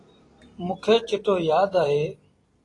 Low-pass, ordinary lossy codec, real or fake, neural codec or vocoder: 10.8 kHz; AAC, 32 kbps; fake; vocoder, 44.1 kHz, 128 mel bands every 256 samples, BigVGAN v2